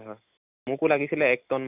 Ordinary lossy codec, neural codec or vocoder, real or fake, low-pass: none; none; real; 3.6 kHz